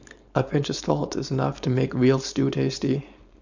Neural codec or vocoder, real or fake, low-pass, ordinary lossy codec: codec, 16 kHz, 4.8 kbps, FACodec; fake; 7.2 kHz; none